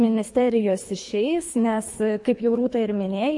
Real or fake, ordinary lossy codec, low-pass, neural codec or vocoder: fake; MP3, 48 kbps; 10.8 kHz; codec, 24 kHz, 3 kbps, HILCodec